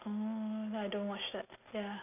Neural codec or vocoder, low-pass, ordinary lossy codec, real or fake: none; 3.6 kHz; none; real